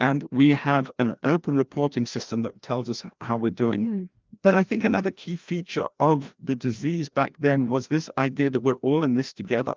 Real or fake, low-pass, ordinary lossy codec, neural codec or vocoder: fake; 7.2 kHz; Opus, 24 kbps; codec, 16 kHz, 1 kbps, FreqCodec, larger model